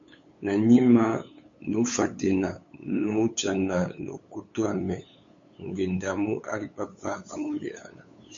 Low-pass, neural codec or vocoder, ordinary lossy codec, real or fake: 7.2 kHz; codec, 16 kHz, 8 kbps, FunCodec, trained on LibriTTS, 25 frames a second; MP3, 48 kbps; fake